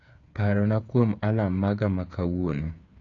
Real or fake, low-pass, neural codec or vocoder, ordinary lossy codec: fake; 7.2 kHz; codec, 16 kHz, 8 kbps, FreqCodec, smaller model; AAC, 48 kbps